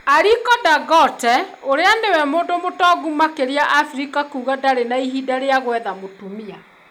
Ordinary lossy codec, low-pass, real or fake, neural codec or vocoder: none; none; real; none